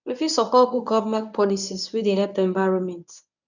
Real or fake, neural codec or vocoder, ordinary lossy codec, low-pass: fake; codec, 24 kHz, 0.9 kbps, WavTokenizer, medium speech release version 1; none; 7.2 kHz